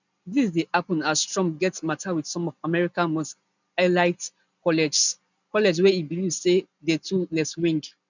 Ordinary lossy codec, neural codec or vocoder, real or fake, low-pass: none; none; real; 7.2 kHz